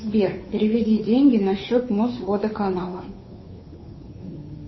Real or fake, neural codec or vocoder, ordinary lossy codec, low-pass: fake; codec, 16 kHz, 2 kbps, FunCodec, trained on Chinese and English, 25 frames a second; MP3, 24 kbps; 7.2 kHz